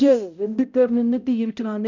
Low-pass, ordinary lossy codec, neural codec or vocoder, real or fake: 7.2 kHz; none; codec, 16 kHz, 0.5 kbps, X-Codec, HuBERT features, trained on balanced general audio; fake